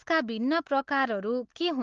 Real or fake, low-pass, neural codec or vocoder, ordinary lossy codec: real; 7.2 kHz; none; Opus, 16 kbps